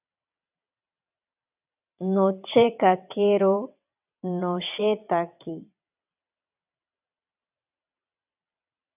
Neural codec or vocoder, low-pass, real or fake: vocoder, 22.05 kHz, 80 mel bands, WaveNeXt; 3.6 kHz; fake